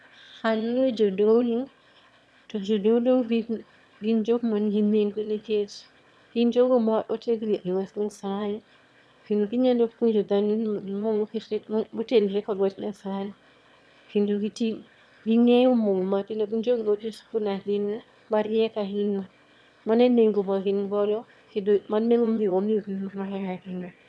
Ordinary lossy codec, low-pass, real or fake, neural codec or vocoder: none; none; fake; autoencoder, 22.05 kHz, a latent of 192 numbers a frame, VITS, trained on one speaker